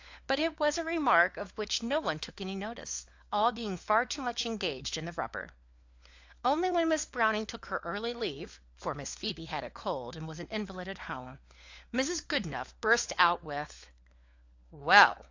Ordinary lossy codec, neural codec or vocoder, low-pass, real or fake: AAC, 48 kbps; codec, 16 kHz, 4 kbps, FunCodec, trained on LibriTTS, 50 frames a second; 7.2 kHz; fake